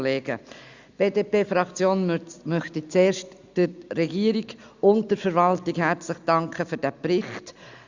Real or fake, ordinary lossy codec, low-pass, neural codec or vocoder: real; Opus, 64 kbps; 7.2 kHz; none